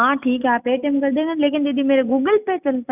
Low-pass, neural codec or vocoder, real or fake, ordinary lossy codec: 3.6 kHz; none; real; none